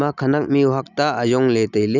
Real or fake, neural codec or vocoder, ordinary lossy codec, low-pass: real; none; none; 7.2 kHz